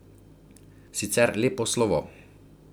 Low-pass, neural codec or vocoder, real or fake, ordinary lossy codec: none; none; real; none